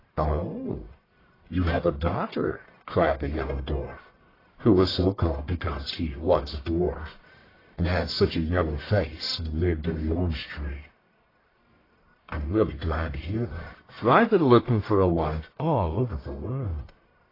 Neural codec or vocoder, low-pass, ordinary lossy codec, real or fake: codec, 44.1 kHz, 1.7 kbps, Pupu-Codec; 5.4 kHz; AAC, 24 kbps; fake